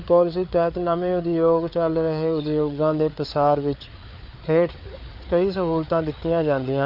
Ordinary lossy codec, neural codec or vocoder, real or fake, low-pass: none; codec, 16 kHz, 4 kbps, FreqCodec, larger model; fake; 5.4 kHz